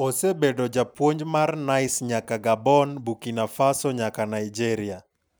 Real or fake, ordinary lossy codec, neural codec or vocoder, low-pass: real; none; none; none